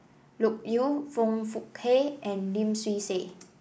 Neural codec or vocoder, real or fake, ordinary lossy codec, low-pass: none; real; none; none